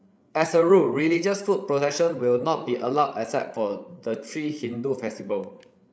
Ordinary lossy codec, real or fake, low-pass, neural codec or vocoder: none; fake; none; codec, 16 kHz, 8 kbps, FreqCodec, larger model